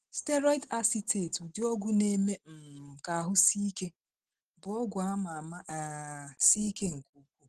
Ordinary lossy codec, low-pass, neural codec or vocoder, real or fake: Opus, 16 kbps; 14.4 kHz; none; real